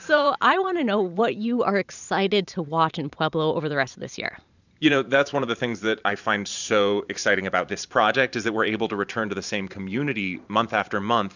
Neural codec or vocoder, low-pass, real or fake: vocoder, 44.1 kHz, 128 mel bands every 512 samples, BigVGAN v2; 7.2 kHz; fake